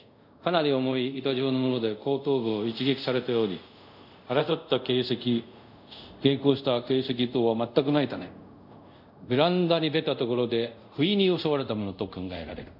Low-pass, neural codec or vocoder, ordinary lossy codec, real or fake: 5.4 kHz; codec, 24 kHz, 0.5 kbps, DualCodec; none; fake